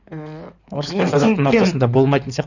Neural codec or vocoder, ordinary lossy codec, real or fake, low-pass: codec, 16 kHz in and 24 kHz out, 2.2 kbps, FireRedTTS-2 codec; none; fake; 7.2 kHz